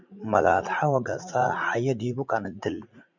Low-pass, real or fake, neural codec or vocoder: 7.2 kHz; fake; vocoder, 44.1 kHz, 80 mel bands, Vocos